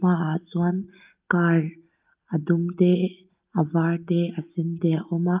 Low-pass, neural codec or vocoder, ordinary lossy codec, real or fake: 3.6 kHz; none; Opus, 24 kbps; real